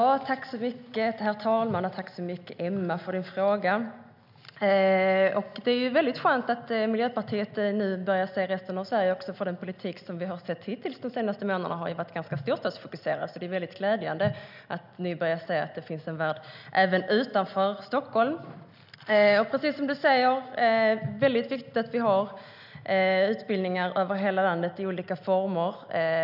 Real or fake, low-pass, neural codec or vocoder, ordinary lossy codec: real; 5.4 kHz; none; none